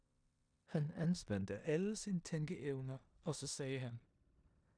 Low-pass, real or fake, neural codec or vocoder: 9.9 kHz; fake; codec, 16 kHz in and 24 kHz out, 0.9 kbps, LongCat-Audio-Codec, four codebook decoder